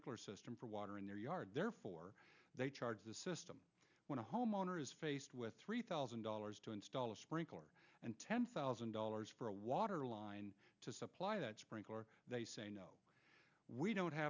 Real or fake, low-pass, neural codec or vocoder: real; 7.2 kHz; none